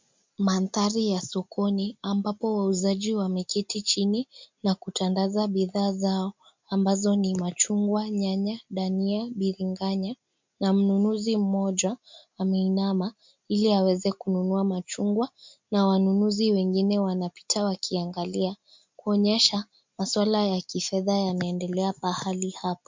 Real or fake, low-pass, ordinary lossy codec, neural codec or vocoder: real; 7.2 kHz; MP3, 64 kbps; none